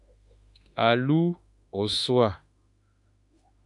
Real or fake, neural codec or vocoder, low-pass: fake; autoencoder, 48 kHz, 32 numbers a frame, DAC-VAE, trained on Japanese speech; 10.8 kHz